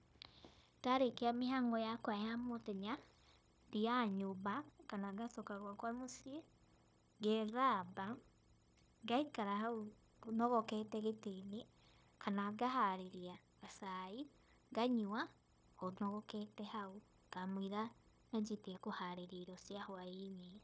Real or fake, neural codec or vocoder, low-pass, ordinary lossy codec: fake; codec, 16 kHz, 0.9 kbps, LongCat-Audio-Codec; none; none